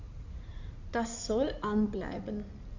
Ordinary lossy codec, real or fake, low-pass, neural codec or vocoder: none; fake; 7.2 kHz; codec, 16 kHz in and 24 kHz out, 2.2 kbps, FireRedTTS-2 codec